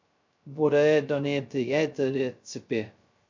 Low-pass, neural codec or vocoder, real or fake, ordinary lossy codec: 7.2 kHz; codec, 16 kHz, 0.2 kbps, FocalCodec; fake; MP3, 64 kbps